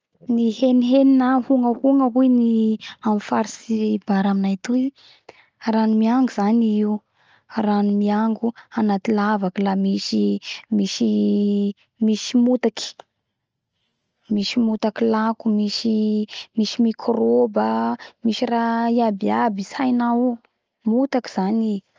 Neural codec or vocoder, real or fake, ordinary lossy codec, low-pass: none; real; Opus, 24 kbps; 7.2 kHz